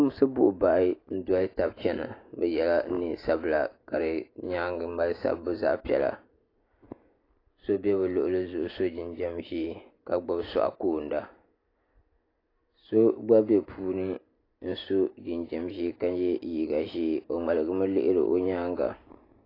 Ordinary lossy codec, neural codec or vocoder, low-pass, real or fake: AAC, 24 kbps; none; 5.4 kHz; real